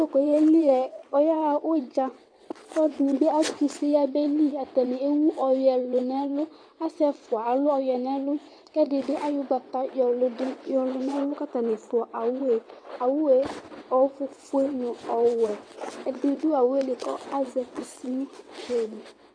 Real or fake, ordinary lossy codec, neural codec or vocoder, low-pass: fake; MP3, 96 kbps; vocoder, 22.05 kHz, 80 mel bands, WaveNeXt; 9.9 kHz